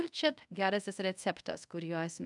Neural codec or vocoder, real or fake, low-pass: codec, 24 kHz, 0.5 kbps, DualCodec; fake; 10.8 kHz